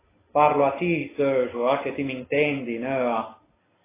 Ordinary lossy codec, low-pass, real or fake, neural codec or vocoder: AAC, 16 kbps; 3.6 kHz; real; none